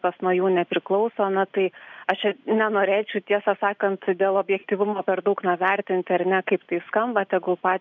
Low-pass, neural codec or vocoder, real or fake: 7.2 kHz; none; real